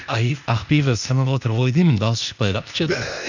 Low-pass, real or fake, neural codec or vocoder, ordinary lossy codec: 7.2 kHz; fake; codec, 16 kHz, 0.8 kbps, ZipCodec; none